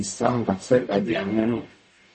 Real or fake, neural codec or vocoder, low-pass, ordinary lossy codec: fake; codec, 44.1 kHz, 0.9 kbps, DAC; 10.8 kHz; MP3, 32 kbps